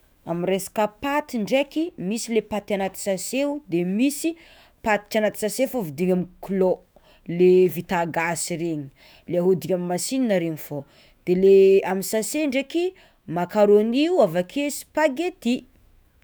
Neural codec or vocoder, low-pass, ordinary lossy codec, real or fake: autoencoder, 48 kHz, 128 numbers a frame, DAC-VAE, trained on Japanese speech; none; none; fake